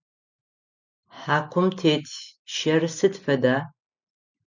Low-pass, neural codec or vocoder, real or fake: 7.2 kHz; none; real